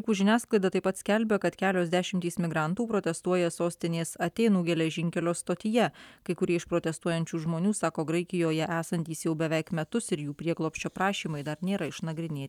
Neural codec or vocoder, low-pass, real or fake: none; 19.8 kHz; real